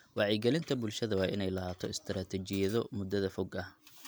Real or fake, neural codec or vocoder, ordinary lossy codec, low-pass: real; none; none; none